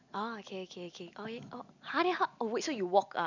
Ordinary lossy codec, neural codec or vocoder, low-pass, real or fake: none; none; 7.2 kHz; real